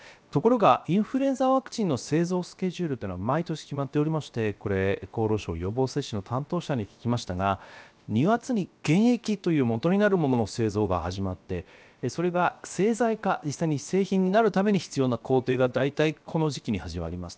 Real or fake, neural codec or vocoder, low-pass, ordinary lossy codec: fake; codec, 16 kHz, 0.7 kbps, FocalCodec; none; none